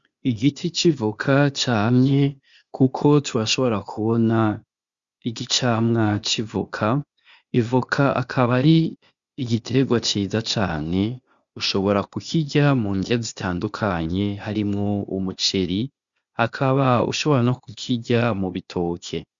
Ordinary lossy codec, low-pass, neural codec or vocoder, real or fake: Opus, 64 kbps; 7.2 kHz; codec, 16 kHz, 0.8 kbps, ZipCodec; fake